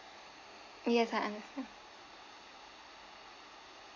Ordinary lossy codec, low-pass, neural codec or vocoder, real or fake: none; 7.2 kHz; none; real